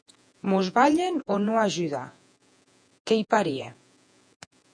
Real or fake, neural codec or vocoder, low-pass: fake; vocoder, 48 kHz, 128 mel bands, Vocos; 9.9 kHz